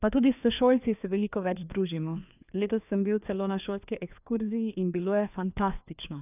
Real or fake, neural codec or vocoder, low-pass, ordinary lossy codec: fake; codec, 16 kHz, 2 kbps, FreqCodec, larger model; 3.6 kHz; none